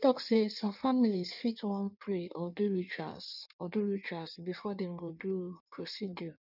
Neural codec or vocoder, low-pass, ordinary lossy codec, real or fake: codec, 16 kHz in and 24 kHz out, 1.1 kbps, FireRedTTS-2 codec; 5.4 kHz; none; fake